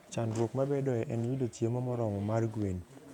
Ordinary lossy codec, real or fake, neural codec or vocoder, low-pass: none; fake; vocoder, 48 kHz, 128 mel bands, Vocos; 19.8 kHz